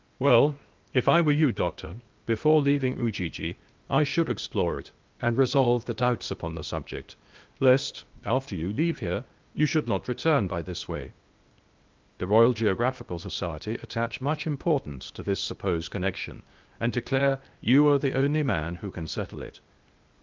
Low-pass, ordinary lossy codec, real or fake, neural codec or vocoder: 7.2 kHz; Opus, 24 kbps; fake; codec, 16 kHz, 0.8 kbps, ZipCodec